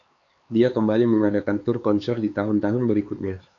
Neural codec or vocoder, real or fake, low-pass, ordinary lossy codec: codec, 16 kHz, 4 kbps, X-Codec, HuBERT features, trained on LibriSpeech; fake; 7.2 kHz; AAC, 48 kbps